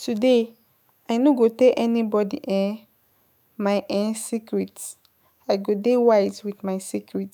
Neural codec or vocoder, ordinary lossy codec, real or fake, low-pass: autoencoder, 48 kHz, 128 numbers a frame, DAC-VAE, trained on Japanese speech; none; fake; none